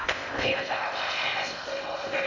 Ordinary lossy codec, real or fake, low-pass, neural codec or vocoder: none; fake; 7.2 kHz; codec, 16 kHz in and 24 kHz out, 0.6 kbps, FocalCodec, streaming, 2048 codes